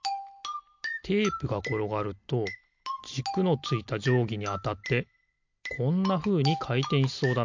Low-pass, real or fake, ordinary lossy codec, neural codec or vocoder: 7.2 kHz; real; MP3, 64 kbps; none